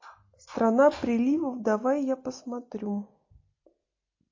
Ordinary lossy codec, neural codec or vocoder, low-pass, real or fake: MP3, 32 kbps; none; 7.2 kHz; real